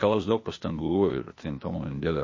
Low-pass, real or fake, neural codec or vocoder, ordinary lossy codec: 7.2 kHz; fake; codec, 16 kHz, 0.8 kbps, ZipCodec; MP3, 32 kbps